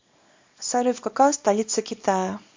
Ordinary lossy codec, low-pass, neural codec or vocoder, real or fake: MP3, 48 kbps; 7.2 kHz; codec, 24 kHz, 0.9 kbps, WavTokenizer, small release; fake